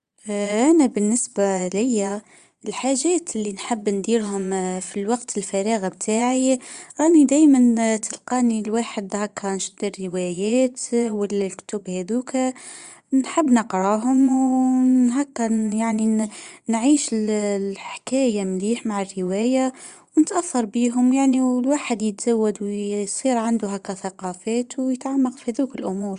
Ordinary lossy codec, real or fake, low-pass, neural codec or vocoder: Opus, 64 kbps; fake; 9.9 kHz; vocoder, 22.05 kHz, 80 mel bands, Vocos